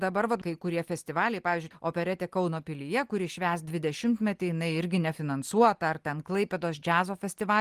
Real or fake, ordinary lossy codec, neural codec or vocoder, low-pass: real; Opus, 24 kbps; none; 14.4 kHz